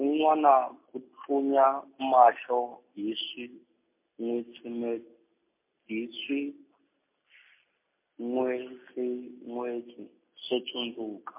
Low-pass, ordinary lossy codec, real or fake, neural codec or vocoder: 3.6 kHz; MP3, 24 kbps; real; none